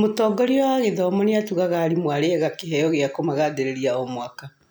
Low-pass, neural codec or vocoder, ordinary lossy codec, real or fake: none; none; none; real